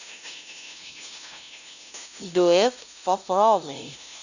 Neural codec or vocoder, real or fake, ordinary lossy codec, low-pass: codec, 16 kHz, 0.5 kbps, FunCodec, trained on LibriTTS, 25 frames a second; fake; none; 7.2 kHz